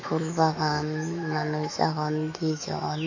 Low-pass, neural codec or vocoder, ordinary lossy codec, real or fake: 7.2 kHz; autoencoder, 48 kHz, 128 numbers a frame, DAC-VAE, trained on Japanese speech; AAC, 48 kbps; fake